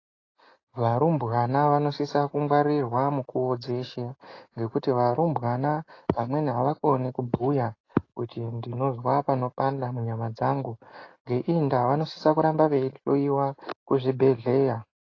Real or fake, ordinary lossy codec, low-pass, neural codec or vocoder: real; AAC, 32 kbps; 7.2 kHz; none